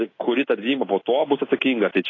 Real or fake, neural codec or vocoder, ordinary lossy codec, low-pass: real; none; AAC, 32 kbps; 7.2 kHz